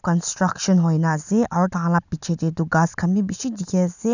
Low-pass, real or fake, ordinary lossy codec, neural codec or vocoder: 7.2 kHz; real; none; none